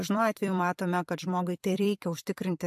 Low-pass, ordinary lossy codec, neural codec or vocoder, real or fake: 14.4 kHz; AAC, 96 kbps; vocoder, 44.1 kHz, 128 mel bands every 256 samples, BigVGAN v2; fake